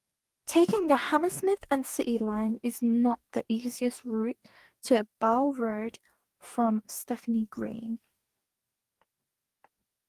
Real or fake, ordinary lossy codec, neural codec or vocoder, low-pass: fake; Opus, 32 kbps; codec, 44.1 kHz, 2.6 kbps, DAC; 14.4 kHz